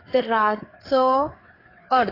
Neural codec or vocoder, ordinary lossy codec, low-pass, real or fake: codec, 16 kHz, 4 kbps, FreqCodec, larger model; AAC, 24 kbps; 5.4 kHz; fake